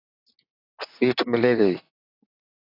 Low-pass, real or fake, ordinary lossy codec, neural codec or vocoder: 5.4 kHz; fake; AAC, 24 kbps; vocoder, 44.1 kHz, 128 mel bands, Pupu-Vocoder